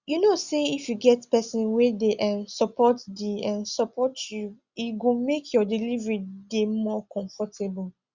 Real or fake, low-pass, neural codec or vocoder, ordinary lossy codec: real; 7.2 kHz; none; Opus, 64 kbps